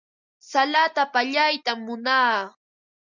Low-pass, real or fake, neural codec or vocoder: 7.2 kHz; real; none